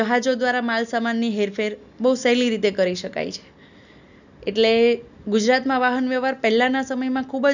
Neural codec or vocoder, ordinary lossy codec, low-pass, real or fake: none; none; 7.2 kHz; real